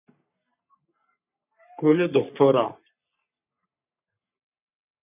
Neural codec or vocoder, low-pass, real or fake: codec, 44.1 kHz, 3.4 kbps, Pupu-Codec; 3.6 kHz; fake